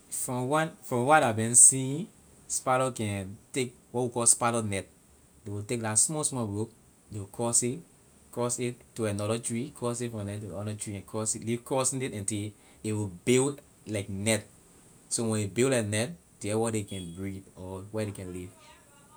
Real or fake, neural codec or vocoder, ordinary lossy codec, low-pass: real; none; none; none